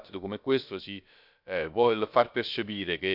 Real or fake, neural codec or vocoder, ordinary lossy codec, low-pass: fake; codec, 16 kHz, about 1 kbps, DyCAST, with the encoder's durations; none; 5.4 kHz